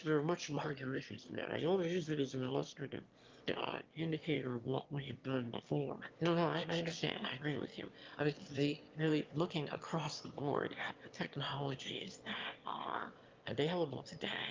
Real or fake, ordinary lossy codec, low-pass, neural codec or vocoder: fake; Opus, 32 kbps; 7.2 kHz; autoencoder, 22.05 kHz, a latent of 192 numbers a frame, VITS, trained on one speaker